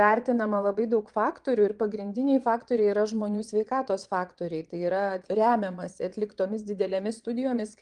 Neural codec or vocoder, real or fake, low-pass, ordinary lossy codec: vocoder, 22.05 kHz, 80 mel bands, Vocos; fake; 9.9 kHz; Opus, 24 kbps